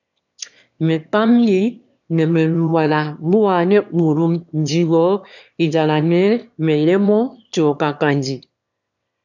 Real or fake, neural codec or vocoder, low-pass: fake; autoencoder, 22.05 kHz, a latent of 192 numbers a frame, VITS, trained on one speaker; 7.2 kHz